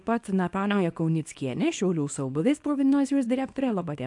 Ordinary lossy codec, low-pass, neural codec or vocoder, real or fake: AAC, 64 kbps; 10.8 kHz; codec, 24 kHz, 0.9 kbps, WavTokenizer, small release; fake